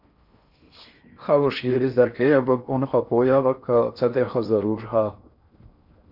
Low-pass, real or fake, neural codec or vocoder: 5.4 kHz; fake; codec, 16 kHz in and 24 kHz out, 0.6 kbps, FocalCodec, streaming, 4096 codes